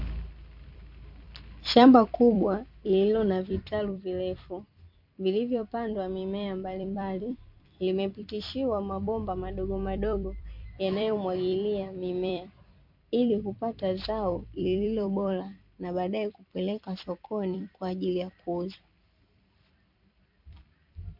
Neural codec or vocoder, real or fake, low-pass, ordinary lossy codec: vocoder, 44.1 kHz, 128 mel bands every 256 samples, BigVGAN v2; fake; 5.4 kHz; MP3, 48 kbps